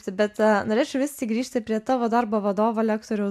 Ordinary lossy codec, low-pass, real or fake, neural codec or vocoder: MP3, 96 kbps; 14.4 kHz; real; none